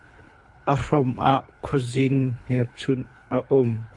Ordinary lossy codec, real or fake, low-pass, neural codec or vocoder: AAC, 64 kbps; fake; 10.8 kHz; codec, 24 kHz, 3 kbps, HILCodec